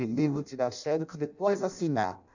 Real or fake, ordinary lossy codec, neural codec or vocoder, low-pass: fake; none; codec, 16 kHz in and 24 kHz out, 0.6 kbps, FireRedTTS-2 codec; 7.2 kHz